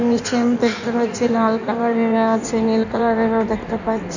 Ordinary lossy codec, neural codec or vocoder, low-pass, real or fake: none; codec, 16 kHz in and 24 kHz out, 1.1 kbps, FireRedTTS-2 codec; 7.2 kHz; fake